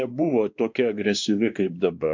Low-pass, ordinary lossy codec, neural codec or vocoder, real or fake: 7.2 kHz; MP3, 64 kbps; codec, 16 kHz, 2 kbps, X-Codec, WavLM features, trained on Multilingual LibriSpeech; fake